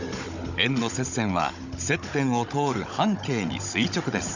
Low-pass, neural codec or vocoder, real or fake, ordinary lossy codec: 7.2 kHz; codec, 16 kHz, 16 kbps, FunCodec, trained on Chinese and English, 50 frames a second; fake; Opus, 64 kbps